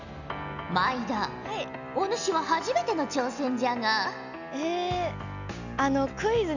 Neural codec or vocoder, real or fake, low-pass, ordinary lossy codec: none; real; 7.2 kHz; none